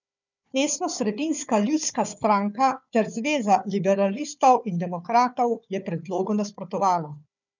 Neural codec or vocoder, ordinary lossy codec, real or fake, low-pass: codec, 16 kHz, 4 kbps, FunCodec, trained on Chinese and English, 50 frames a second; none; fake; 7.2 kHz